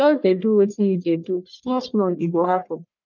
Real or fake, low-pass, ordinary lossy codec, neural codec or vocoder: fake; 7.2 kHz; none; codec, 44.1 kHz, 1.7 kbps, Pupu-Codec